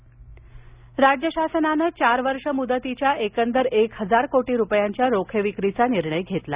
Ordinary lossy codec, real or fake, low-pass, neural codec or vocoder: Opus, 64 kbps; real; 3.6 kHz; none